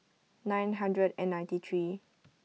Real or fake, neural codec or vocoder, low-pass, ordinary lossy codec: real; none; none; none